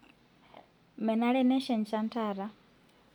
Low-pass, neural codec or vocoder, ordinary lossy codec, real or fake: 19.8 kHz; none; none; real